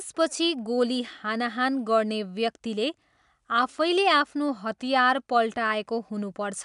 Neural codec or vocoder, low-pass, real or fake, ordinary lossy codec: none; 10.8 kHz; real; none